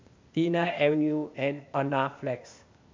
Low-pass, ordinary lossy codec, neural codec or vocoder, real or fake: 7.2 kHz; MP3, 48 kbps; codec, 16 kHz, 0.8 kbps, ZipCodec; fake